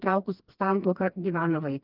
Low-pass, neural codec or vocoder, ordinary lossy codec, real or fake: 5.4 kHz; codec, 16 kHz, 2 kbps, FreqCodec, smaller model; Opus, 32 kbps; fake